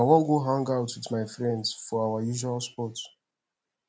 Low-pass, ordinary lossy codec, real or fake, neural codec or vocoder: none; none; real; none